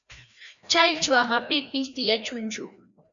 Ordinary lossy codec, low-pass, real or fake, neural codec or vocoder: MP3, 96 kbps; 7.2 kHz; fake; codec, 16 kHz, 1 kbps, FreqCodec, larger model